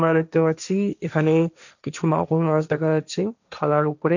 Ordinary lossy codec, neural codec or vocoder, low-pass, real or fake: none; codec, 16 kHz, 1.1 kbps, Voila-Tokenizer; 7.2 kHz; fake